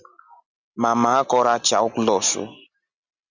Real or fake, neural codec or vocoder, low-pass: real; none; 7.2 kHz